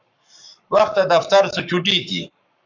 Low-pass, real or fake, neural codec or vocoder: 7.2 kHz; fake; codec, 44.1 kHz, 7.8 kbps, Pupu-Codec